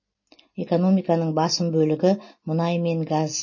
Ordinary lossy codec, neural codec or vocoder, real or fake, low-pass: MP3, 32 kbps; none; real; 7.2 kHz